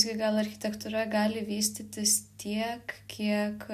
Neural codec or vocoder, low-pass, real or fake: none; 14.4 kHz; real